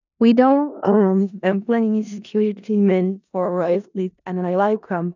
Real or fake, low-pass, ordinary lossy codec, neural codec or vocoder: fake; 7.2 kHz; none; codec, 16 kHz in and 24 kHz out, 0.4 kbps, LongCat-Audio-Codec, four codebook decoder